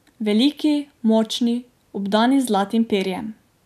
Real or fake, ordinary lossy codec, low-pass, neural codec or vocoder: real; none; 14.4 kHz; none